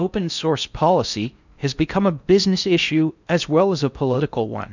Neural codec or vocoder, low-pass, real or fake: codec, 16 kHz in and 24 kHz out, 0.6 kbps, FocalCodec, streaming, 4096 codes; 7.2 kHz; fake